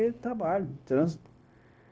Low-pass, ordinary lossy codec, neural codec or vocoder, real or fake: none; none; codec, 16 kHz, 0.9 kbps, LongCat-Audio-Codec; fake